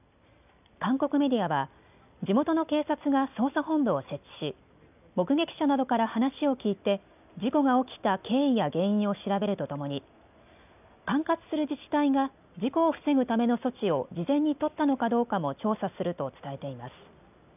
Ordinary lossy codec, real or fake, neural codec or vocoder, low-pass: none; real; none; 3.6 kHz